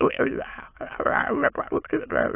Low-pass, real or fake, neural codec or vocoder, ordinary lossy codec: 3.6 kHz; fake; autoencoder, 22.05 kHz, a latent of 192 numbers a frame, VITS, trained on many speakers; AAC, 24 kbps